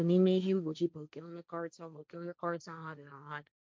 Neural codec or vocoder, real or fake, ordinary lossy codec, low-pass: codec, 16 kHz, 1.1 kbps, Voila-Tokenizer; fake; none; none